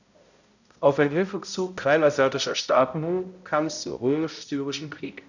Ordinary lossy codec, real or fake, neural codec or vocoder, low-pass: none; fake; codec, 16 kHz, 0.5 kbps, X-Codec, HuBERT features, trained on balanced general audio; 7.2 kHz